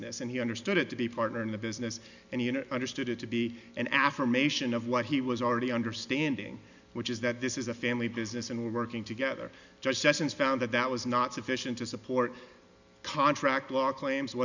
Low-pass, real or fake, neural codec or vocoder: 7.2 kHz; real; none